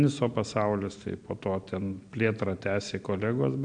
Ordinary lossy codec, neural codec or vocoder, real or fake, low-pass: AAC, 64 kbps; none; real; 9.9 kHz